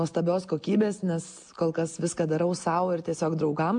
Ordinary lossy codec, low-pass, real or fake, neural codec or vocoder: MP3, 64 kbps; 9.9 kHz; real; none